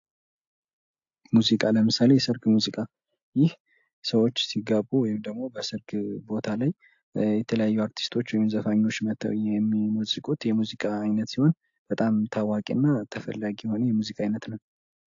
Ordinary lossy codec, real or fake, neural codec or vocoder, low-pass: AAC, 48 kbps; real; none; 7.2 kHz